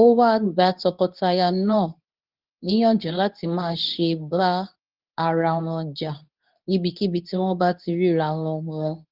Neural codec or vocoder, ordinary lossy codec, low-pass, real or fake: codec, 24 kHz, 0.9 kbps, WavTokenizer, medium speech release version 2; Opus, 24 kbps; 5.4 kHz; fake